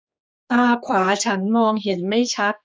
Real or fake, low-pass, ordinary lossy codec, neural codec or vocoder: fake; none; none; codec, 16 kHz, 4 kbps, X-Codec, HuBERT features, trained on general audio